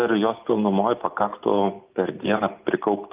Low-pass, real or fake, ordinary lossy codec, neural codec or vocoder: 3.6 kHz; real; Opus, 32 kbps; none